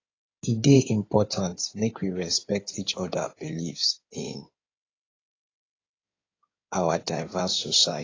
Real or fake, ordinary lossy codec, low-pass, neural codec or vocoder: fake; AAC, 32 kbps; 7.2 kHz; codec, 16 kHz in and 24 kHz out, 2.2 kbps, FireRedTTS-2 codec